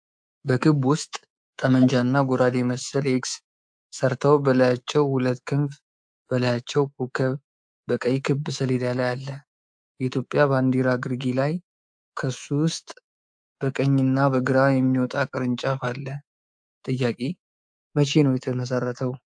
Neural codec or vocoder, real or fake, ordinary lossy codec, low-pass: codec, 24 kHz, 3.1 kbps, DualCodec; fake; AAC, 64 kbps; 9.9 kHz